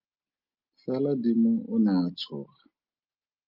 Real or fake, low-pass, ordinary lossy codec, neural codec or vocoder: real; 5.4 kHz; Opus, 24 kbps; none